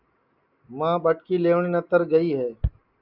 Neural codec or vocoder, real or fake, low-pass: none; real; 5.4 kHz